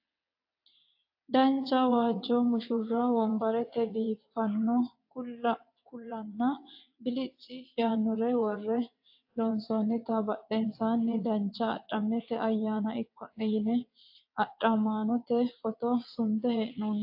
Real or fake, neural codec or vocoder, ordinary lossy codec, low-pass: fake; vocoder, 22.05 kHz, 80 mel bands, WaveNeXt; AAC, 48 kbps; 5.4 kHz